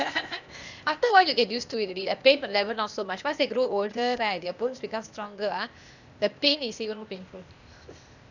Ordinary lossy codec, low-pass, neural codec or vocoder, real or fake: none; 7.2 kHz; codec, 16 kHz, 0.8 kbps, ZipCodec; fake